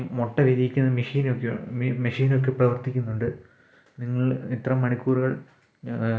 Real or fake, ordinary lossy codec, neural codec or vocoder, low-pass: real; none; none; none